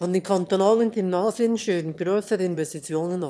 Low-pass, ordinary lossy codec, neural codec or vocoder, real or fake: none; none; autoencoder, 22.05 kHz, a latent of 192 numbers a frame, VITS, trained on one speaker; fake